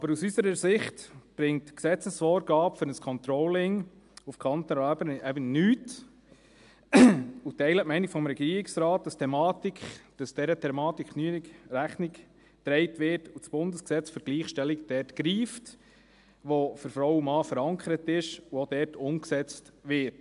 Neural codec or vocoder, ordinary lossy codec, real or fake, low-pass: none; AAC, 96 kbps; real; 10.8 kHz